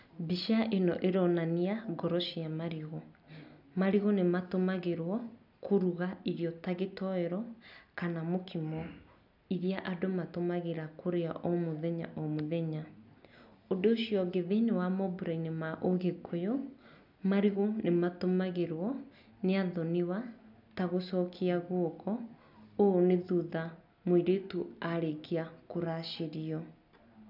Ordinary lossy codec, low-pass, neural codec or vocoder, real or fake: none; 5.4 kHz; none; real